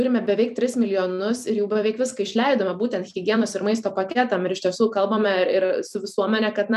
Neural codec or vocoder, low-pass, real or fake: vocoder, 44.1 kHz, 128 mel bands every 256 samples, BigVGAN v2; 14.4 kHz; fake